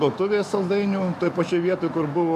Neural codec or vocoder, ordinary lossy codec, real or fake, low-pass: autoencoder, 48 kHz, 128 numbers a frame, DAC-VAE, trained on Japanese speech; MP3, 64 kbps; fake; 14.4 kHz